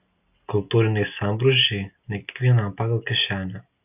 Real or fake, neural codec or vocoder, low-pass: real; none; 3.6 kHz